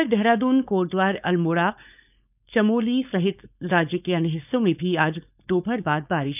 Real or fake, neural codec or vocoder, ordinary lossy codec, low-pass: fake; codec, 16 kHz, 4.8 kbps, FACodec; none; 3.6 kHz